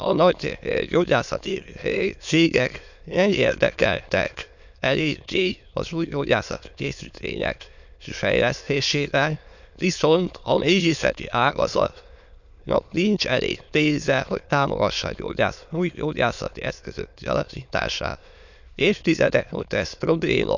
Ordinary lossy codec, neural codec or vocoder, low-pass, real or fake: none; autoencoder, 22.05 kHz, a latent of 192 numbers a frame, VITS, trained on many speakers; 7.2 kHz; fake